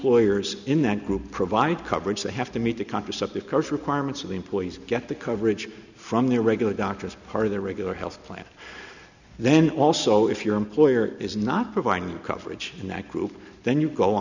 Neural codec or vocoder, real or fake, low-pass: none; real; 7.2 kHz